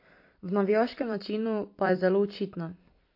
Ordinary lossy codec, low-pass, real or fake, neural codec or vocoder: MP3, 32 kbps; 5.4 kHz; fake; vocoder, 44.1 kHz, 128 mel bands every 256 samples, BigVGAN v2